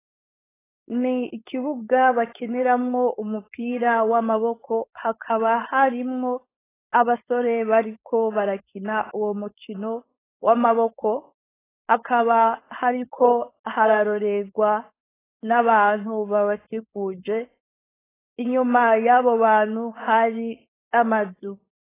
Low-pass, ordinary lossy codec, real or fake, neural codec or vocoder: 3.6 kHz; AAC, 16 kbps; fake; codec, 16 kHz, 4.8 kbps, FACodec